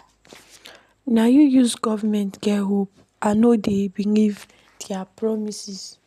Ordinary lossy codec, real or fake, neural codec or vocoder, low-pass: none; real; none; 14.4 kHz